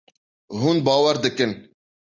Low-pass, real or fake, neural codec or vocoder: 7.2 kHz; real; none